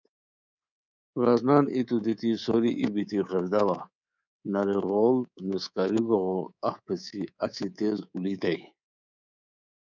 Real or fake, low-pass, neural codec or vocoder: fake; 7.2 kHz; codec, 24 kHz, 3.1 kbps, DualCodec